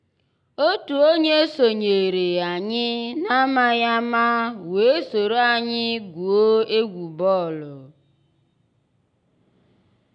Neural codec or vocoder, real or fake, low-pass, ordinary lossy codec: none; real; 9.9 kHz; none